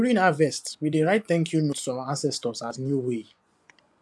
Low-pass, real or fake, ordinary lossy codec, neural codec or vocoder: none; fake; none; vocoder, 24 kHz, 100 mel bands, Vocos